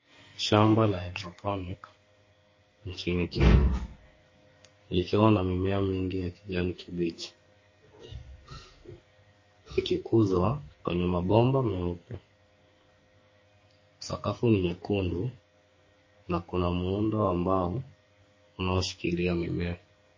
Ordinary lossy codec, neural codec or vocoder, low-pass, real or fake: MP3, 32 kbps; codec, 44.1 kHz, 2.6 kbps, SNAC; 7.2 kHz; fake